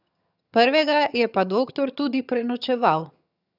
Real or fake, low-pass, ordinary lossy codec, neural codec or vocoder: fake; 5.4 kHz; none; vocoder, 22.05 kHz, 80 mel bands, HiFi-GAN